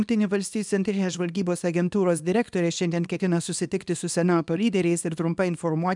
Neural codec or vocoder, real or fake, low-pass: codec, 24 kHz, 0.9 kbps, WavTokenizer, small release; fake; 10.8 kHz